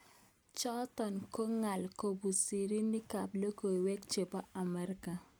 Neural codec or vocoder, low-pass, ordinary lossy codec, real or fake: none; none; none; real